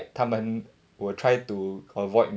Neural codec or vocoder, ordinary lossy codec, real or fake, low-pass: none; none; real; none